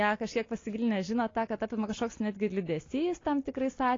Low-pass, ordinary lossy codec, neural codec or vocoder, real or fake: 7.2 kHz; AAC, 32 kbps; none; real